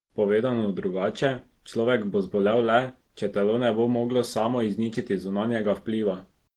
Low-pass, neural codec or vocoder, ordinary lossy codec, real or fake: 19.8 kHz; none; Opus, 16 kbps; real